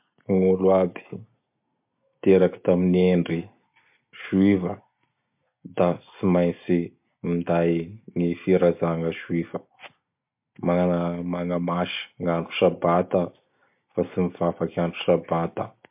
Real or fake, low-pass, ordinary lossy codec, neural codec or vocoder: real; 3.6 kHz; MP3, 32 kbps; none